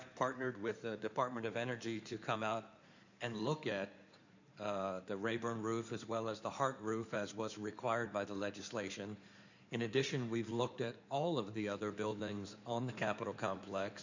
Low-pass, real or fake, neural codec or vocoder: 7.2 kHz; fake; codec, 16 kHz in and 24 kHz out, 2.2 kbps, FireRedTTS-2 codec